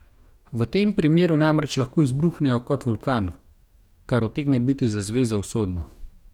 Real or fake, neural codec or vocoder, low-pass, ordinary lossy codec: fake; codec, 44.1 kHz, 2.6 kbps, DAC; 19.8 kHz; none